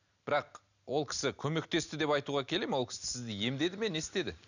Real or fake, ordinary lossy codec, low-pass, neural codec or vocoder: real; none; 7.2 kHz; none